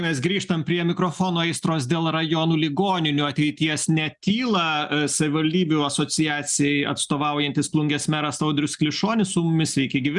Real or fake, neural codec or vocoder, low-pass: real; none; 10.8 kHz